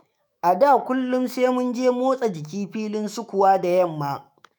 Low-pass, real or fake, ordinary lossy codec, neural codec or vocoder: none; fake; none; autoencoder, 48 kHz, 128 numbers a frame, DAC-VAE, trained on Japanese speech